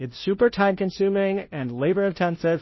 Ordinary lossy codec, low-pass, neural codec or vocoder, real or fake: MP3, 24 kbps; 7.2 kHz; codec, 16 kHz, 0.5 kbps, FunCodec, trained on Chinese and English, 25 frames a second; fake